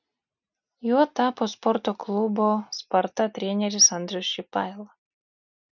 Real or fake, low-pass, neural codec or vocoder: real; 7.2 kHz; none